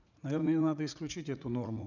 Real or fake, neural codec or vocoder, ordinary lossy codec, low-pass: fake; vocoder, 44.1 kHz, 80 mel bands, Vocos; none; 7.2 kHz